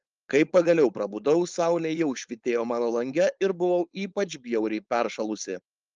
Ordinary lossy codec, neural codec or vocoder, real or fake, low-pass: Opus, 24 kbps; codec, 16 kHz, 4.8 kbps, FACodec; fake; 7.2 kHz